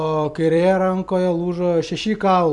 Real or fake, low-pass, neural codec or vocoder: fake; 10.8 kHz; vocoder, 44.1 kHz, 128 mel bands every 256 samples, BigVGAN v2